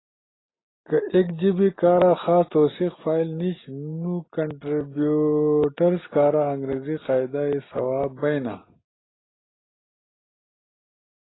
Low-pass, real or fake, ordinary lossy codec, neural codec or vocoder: 7.2 kHz; real; AAC, 16 kbps; none